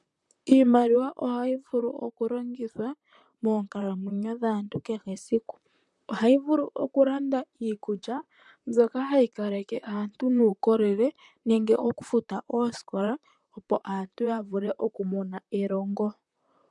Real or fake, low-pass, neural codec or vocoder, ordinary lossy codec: fake; 10.8 kHz; vocoder, 44.1 kHz, 128 mel bands, Pupu-Vocoder; MP3, 96 kbps